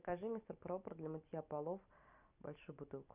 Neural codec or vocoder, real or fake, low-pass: none; real; 3.6 kHz